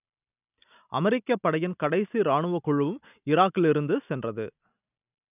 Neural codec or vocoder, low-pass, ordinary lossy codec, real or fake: none; 3.6 kHz; none; real